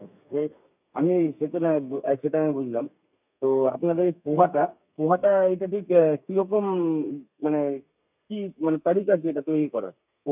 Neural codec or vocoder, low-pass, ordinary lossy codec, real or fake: codec, 32 kHz, 1.9 kbps, SNAC; 3.6 kHz; none; fake